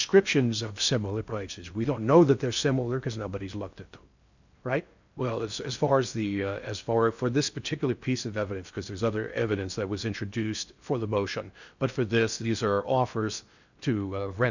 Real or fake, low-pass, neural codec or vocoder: fake; 7.2 kHz; codec, 16 kHz in and 24 kHz out, 0.6 kbps, FocalCodec, streaming, 4096 codes